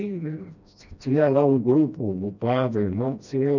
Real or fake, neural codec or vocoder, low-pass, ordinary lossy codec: fake; codec, 16 kHz, 1 kbps, FreqCodec, smaller model; 7.2 kHz; none